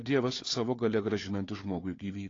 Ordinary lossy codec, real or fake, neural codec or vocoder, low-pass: AAC, 32 kbps; fake; codec, 16 kHz, 4 kbps, FunCodec, trained on Chinese and English, 50 frames a second; 7.2 kHz